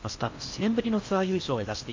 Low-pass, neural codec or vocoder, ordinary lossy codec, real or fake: 7.2 kHz; codec, 16 kHz in and 24 kHz out, 0.8 kbps, FocalCodec, streaming, 65536 codes; MP3, 48 kbps; fake